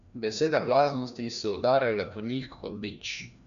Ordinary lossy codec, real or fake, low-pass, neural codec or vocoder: none; fake; 7.2 kHz; codec, 16 kHz, 1 kbps, FreqCodec, larger model